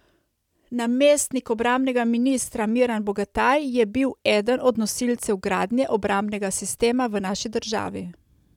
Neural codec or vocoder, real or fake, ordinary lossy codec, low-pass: none; real; none; 19.8 kHz